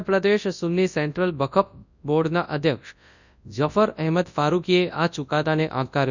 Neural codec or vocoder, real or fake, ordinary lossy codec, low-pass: codec, 24 kHz, 0.9 kbps, WavTokenizer, large speech release; fake; none; 7.2 kHz